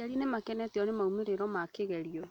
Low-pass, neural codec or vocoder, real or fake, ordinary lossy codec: 19.8 kHz; none; real; none